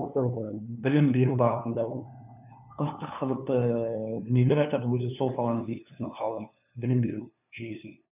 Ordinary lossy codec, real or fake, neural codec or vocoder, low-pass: none; fake; codec, 16 kHz, 2 kbps, FunCodec, trained on LibriTTS, 25 frames a second; 3.6 kHz